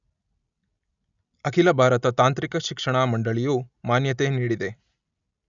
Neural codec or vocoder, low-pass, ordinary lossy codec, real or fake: none; 7.2 kHz; none; real